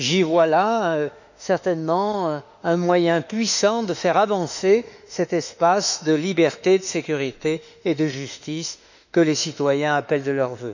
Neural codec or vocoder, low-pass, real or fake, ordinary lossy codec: autoencoder, 48 kHz, 32 numbers a frame, DAC-VAE, trained on Japanese speech; 7.2 kHz; fake; none